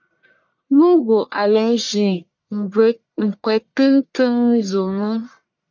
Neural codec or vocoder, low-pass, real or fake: codec, 44.1 kHz, 1.7 kbps, Pupu-Codec; 7.2 kHz; fake